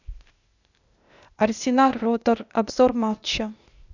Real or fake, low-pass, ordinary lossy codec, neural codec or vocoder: fake; 7.2 kHz; none; codec, 16 kHz, 0.8 kbps, ZipCodec